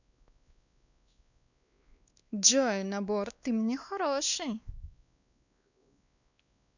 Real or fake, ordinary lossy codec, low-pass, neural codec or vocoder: fake; none; 7.2 kHz; codec, 16 kHz, 2 kbps, X-Codec, WavLM features, trained on Multilingual LibriSpeech